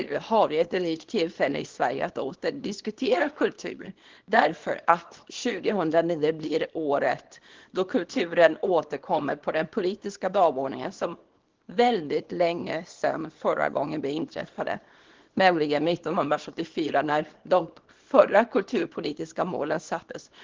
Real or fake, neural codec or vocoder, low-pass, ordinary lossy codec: fake; codec, 24 kHz, 0.9 kbps, WavTokenizer, small release; 7.2 kHz; Opus, 16 kbps